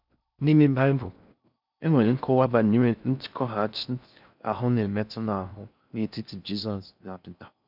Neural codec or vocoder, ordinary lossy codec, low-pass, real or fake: codec, 16 kHz in and 24 kHz out, 0.6 kbps, FocalCodec, streaming, 4096 codes; none; 5.4 kHz; fake